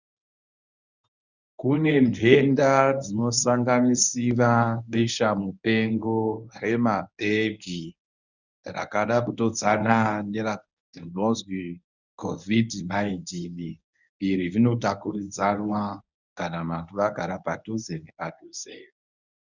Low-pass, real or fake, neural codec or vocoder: 7.2 kHz; fake; codec, 24 kHz, 0.9 kbps, WavTokenizer, medium speech release version 1